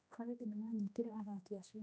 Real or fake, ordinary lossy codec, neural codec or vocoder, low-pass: fake; none; codec, 16 kHz, 1 kbps, X-Codec, HuBERT features, trained on general audio; none